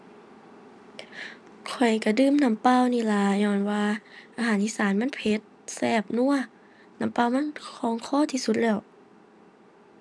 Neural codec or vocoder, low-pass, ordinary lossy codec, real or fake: none; none; none; real